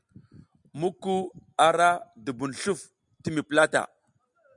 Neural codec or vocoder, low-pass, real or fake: none; 10.8 kHz; real